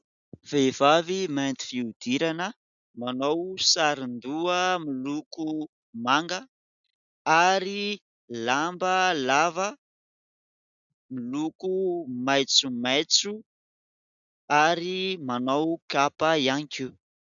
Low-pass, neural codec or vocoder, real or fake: 7.2 kHz; none; real